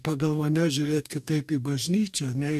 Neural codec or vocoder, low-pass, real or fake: codec, 44.1 kHz, 2.6 kbps, DAC; 14.4 kHz; fake